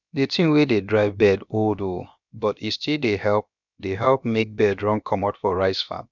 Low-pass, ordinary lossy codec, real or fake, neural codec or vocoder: 7.2 kHz; none; fake; codec, 16 kHz, about 1 kbps, DyCAST, with the encoder's durations